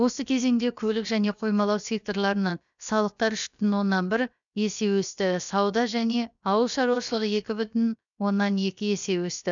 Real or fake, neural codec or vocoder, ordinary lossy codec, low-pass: fake; codec, 16 kHz, about 1 kbps, DyCAST, with the encoder's durations; none; 7.2 kHz